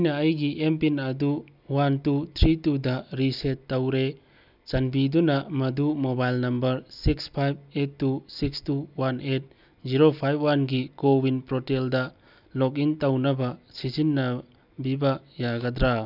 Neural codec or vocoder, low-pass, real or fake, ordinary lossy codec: none; 5.4 kHz; real; none